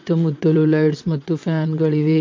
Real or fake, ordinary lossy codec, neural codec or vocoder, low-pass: fake; MP3, 48 kbps; vocoder, 22.05 kHz, 80 mel bands, WaveNeXt; 7.2 kHz